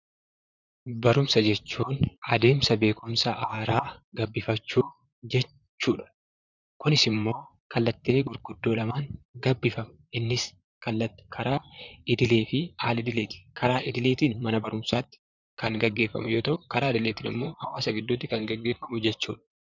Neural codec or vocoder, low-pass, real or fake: vocoder, 44.1 kHz, 128 mel bands, Pupu-Vocoder; 7.2 kHz; fake